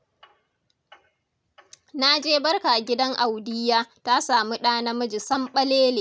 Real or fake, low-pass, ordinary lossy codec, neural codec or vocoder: real; none; none; none